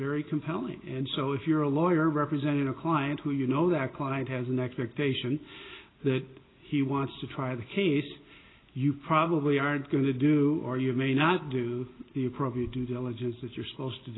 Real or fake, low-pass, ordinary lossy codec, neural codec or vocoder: real; 7.2 kHz; AAC, 16 kbps; none